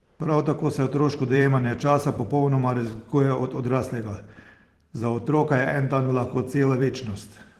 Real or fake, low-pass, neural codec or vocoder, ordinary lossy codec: fake; 14.4 kHz; vocoder, 44.1 kHz, 128 mel bands every 512 samples, BigVGAN v2; Opus, 16 kbps